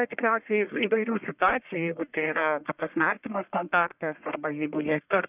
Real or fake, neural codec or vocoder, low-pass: fake; codec, 44.1 kHz, 1.7 kbps, Pupu-Codec; 3.6 kHz